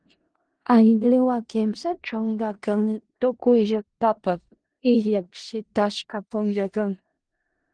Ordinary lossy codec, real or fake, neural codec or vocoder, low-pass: Opus, 16 kbps; fake; codec, 16 kHz in and 24 kHz out, 0.4 kbps, LongCat-Audio-Codec, four codebook decoder; 9.9 kHz